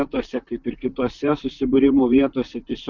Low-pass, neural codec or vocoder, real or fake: 7.2 kHz; vocoder, 44.1 kHz, 128 mel bands, Pupu-Vocoder; fake